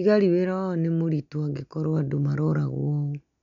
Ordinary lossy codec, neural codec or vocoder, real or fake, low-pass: none; none; real; 7.2 kHz